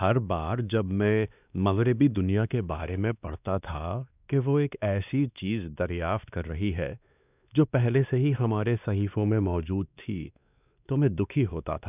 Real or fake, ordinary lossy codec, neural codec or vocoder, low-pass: fake; none; codec, 16 kHz, 2 kbps, X-Codec, WavLM features, trained on Multilingual LibriSpeech; 3.6 kHz